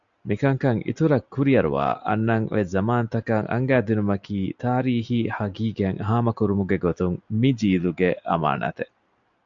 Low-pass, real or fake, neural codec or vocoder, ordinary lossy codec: 7.2 kHz; real; none; AAC, 64 kbps